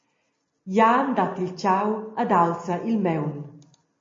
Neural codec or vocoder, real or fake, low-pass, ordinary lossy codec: none; real; 7.2 kHz; MP3, 32 kbps